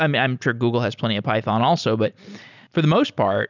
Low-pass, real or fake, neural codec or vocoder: 7.2 kHz; real; none